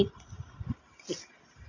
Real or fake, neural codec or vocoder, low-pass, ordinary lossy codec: real; none; 7.2 kHz; none